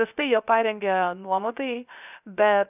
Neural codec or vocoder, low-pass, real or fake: codec, 16 kHz, 0.3 kbps, FocalCodec; 3.6 kHz; fake